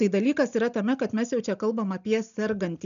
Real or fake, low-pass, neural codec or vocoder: real; 7.2 kHz; none